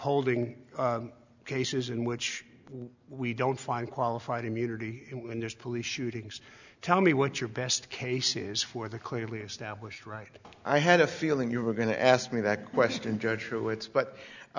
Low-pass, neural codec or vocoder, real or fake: 7.2 kHz; none; real